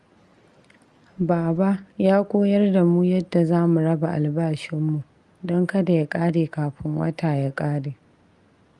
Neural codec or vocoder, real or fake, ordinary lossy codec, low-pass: none; real; Opus, 32 kbps; 10.8 kHz